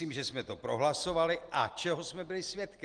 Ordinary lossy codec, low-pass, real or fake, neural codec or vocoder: Opus, 24 kbps; 9.9 kHz; real; none